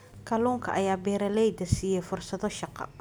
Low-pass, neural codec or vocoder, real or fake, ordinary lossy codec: none; none; real; none